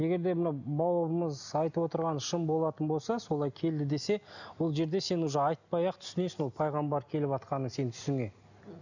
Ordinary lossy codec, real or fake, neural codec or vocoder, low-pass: none; real; none; 7.2 kHz